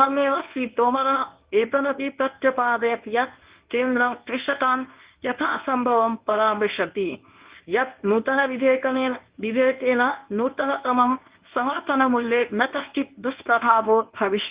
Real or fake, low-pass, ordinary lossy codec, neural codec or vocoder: fake; 3.6 kHz; Opus, 32 kbps; codec, 24 kHz, 0.9 kbps, WavTokenizer, medium speech release version 1